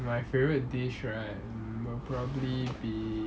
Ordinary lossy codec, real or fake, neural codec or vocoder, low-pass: none; real; none; none